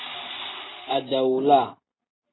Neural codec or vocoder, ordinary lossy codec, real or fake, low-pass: none; AAC, 16 kbps; real; 7.2 kHz